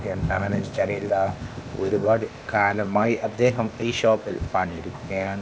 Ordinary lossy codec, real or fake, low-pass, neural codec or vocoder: none; fake; none; codec, 16 kHz, 0.8 kbps, ZipCodec